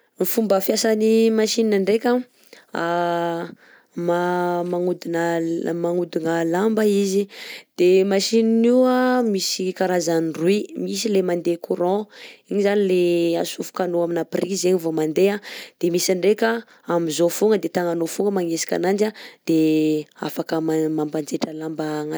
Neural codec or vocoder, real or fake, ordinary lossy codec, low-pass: none; real; none; none